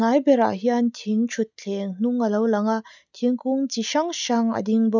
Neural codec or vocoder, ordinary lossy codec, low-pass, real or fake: none; none; 7.2 kHz; real